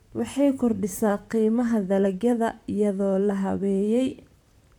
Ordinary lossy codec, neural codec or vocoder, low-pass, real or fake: MP3, 96 kbps; vocoder, 44.1 kHz, 128 mel bands, Pupu-Vocoder; 19.8 kHz; fake